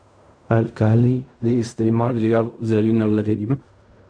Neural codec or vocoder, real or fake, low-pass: codec, 16 kHz in and 24 kHz out, 0.4 kbps, LongCat-Audio-Codec, fine tuned four codebook decoder; fake; 9.9 kHz